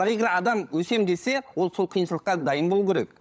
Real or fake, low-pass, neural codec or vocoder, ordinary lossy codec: fake; none; codec, 16 kHz, 16 kbps, FunCodec, trained on LibriTTS, 50 frames a second; none